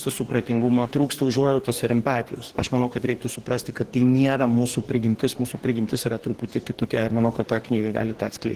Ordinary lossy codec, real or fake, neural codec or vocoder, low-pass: Opus, 16 kbps; fake; codec, 44.1 kHz, 2.6 kbps, DAC; 14.4 kHz